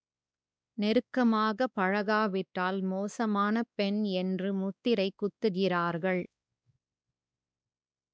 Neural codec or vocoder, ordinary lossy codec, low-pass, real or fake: codec, 16 kHz, 2 kbps, X-Codec, WavLM features, trained on Multilingual LibriSpeech; none; none; fake